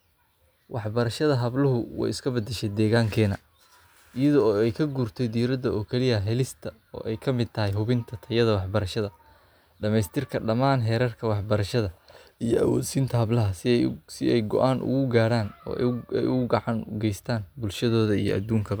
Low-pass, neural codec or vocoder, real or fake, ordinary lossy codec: none; none; real; none